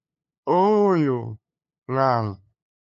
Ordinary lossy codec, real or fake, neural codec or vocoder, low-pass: AAC, 64 kbps; fake; codec, 16 kHz, 2 kbps, FunCodec, trained on LibriTTS, 25 frames a second; 7.2 kHz